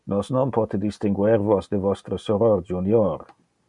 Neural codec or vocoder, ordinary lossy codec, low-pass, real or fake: none; MP3, 96 kbps; 10.8 kHz; real